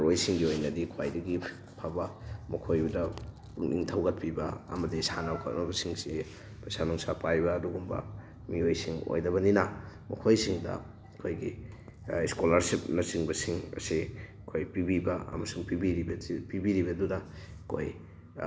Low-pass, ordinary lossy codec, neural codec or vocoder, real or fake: none; none; none; real